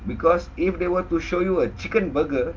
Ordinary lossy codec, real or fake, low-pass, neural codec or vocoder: Opus, 24 kbps; real; 7.2 kHz; none